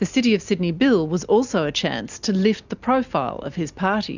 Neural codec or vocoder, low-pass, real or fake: none; 7.2 kHz; real